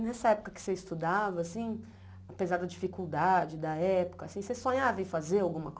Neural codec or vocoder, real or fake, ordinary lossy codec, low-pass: none; real; none; none